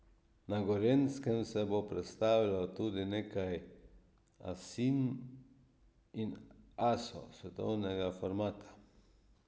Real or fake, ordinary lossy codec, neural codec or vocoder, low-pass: real; none; none; none